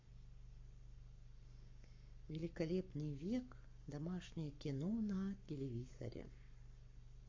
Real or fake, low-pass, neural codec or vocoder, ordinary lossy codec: fake; 7.2 kHz; codec, 44.1 kHz, 7.8 kbps, Pupu-Codec; MP3, 48 kbps